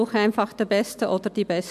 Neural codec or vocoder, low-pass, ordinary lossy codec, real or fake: none; 14.4 kHz; AAC, 96 kbps; real